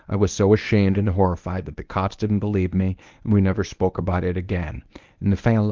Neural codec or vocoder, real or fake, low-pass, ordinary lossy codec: codec, 24 kHz, 0.9 kbps, WavTokenizer, medium speech release version 1; fake; 7.2 kHz; Opus, 32 kbps